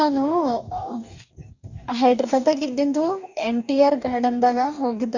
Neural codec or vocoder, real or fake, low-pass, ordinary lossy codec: codec, 44.1 kHz, 2.6 kbps, DAC; fake; 7.2 kHz; Opus, 64 kbps